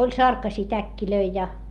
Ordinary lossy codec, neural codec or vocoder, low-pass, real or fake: Opus, 32 kbps; none; 19.8 kHz; real